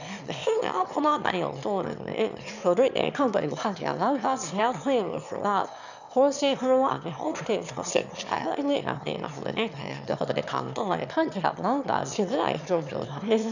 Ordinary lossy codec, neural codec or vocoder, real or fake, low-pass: none; autoencoder, 22.05 kHz, a latent of 192 numbers a frame, VITS, trained on one speaker; fake; 7.2 kHz